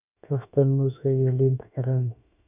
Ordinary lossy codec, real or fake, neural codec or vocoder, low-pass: none; fake; autoencoder, 48 kHz, 32 numbers a frame, DAC-VAE, trained on Japanese speech; 3.6 kHz